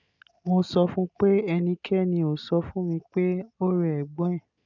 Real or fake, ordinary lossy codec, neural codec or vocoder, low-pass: real; none; none; 7.2 kHz